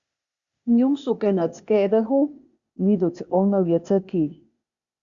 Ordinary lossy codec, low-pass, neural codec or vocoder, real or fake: Opus, 64 kbps; 7.2 kHz; codec, 16 kHz, 0.8 kbps, ZipCodec; fake